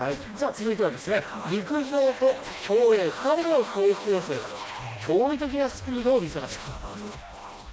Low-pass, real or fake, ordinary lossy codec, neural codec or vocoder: none; fake; none; codec, 16 kHz, 1 kbps, FreqCodec, smaller model